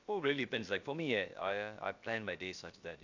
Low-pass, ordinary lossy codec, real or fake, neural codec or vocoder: 7.2 kHz; AAC, 48 kbps; fake; codec, 16 kHz, about 1 kbps, DyCAST, with the encoder's durations